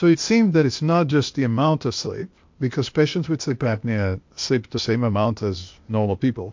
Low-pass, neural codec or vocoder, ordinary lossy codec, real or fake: 7.2 kHz; codec, 16 kHz, 0.8 kbps, ZipCodec; MP3, 48 kbps; fake